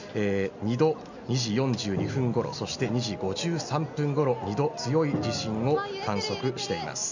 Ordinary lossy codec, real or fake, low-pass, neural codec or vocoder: none; real; 7.2 kHz; none